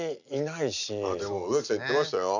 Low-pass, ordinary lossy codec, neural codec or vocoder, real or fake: 7.2 kHz; none; none; real